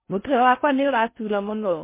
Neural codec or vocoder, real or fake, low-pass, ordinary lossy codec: codec, 16 kHz in and 24 kHz out, 0.6 kbps, FocalCodec, streaming, 4096 codes; fake; 3.6 kHz; MP3, 24 kbps